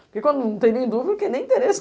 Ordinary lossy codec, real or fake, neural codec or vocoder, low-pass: none; real; none; none